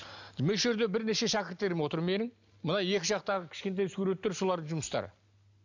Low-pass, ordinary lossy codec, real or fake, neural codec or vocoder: 7.2 kHz; none; real; none